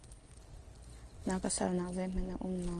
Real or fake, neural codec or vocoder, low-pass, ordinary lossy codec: real; none; 9.9 kHz; Opus, 24 kbps